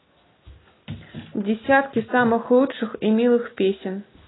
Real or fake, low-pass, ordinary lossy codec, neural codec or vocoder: real; 7.2 kHz; AAC, 16 kbps; none